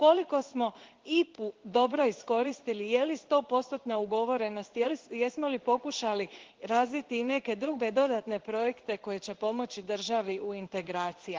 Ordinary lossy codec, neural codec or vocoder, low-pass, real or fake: Opus, 16 kbps; codec, 16 kHz in and 24 kHz out, 1 kbps, XY-Tokenizer; 7.2 kHz; fake